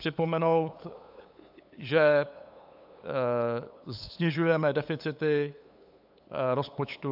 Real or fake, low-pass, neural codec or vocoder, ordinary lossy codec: fake; 5.4 kHz; codec, 16 kHz, 8 kbps, FunCodec, trained on LibriTTS, 25 frames a second; AAC, 48 kbps